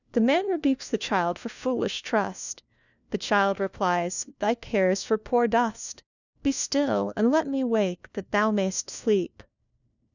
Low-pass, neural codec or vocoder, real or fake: 7.2 kHz; codec, 16 kHz, 1 kbps, FunCodec, trained on LibriTTS, 50 frames a second; fake